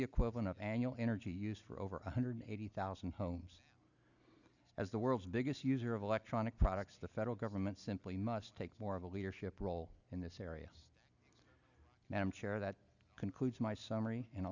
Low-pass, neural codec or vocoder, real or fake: 7.2 kHz; none; real